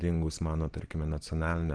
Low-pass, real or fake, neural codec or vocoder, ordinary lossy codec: 9.9 kHz; real; none; Opus, 16 kbps